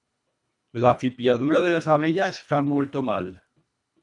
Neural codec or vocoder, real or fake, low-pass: codec, 24 kHz, 1.5 kbps, HILCodec; fake; 10.8 kHz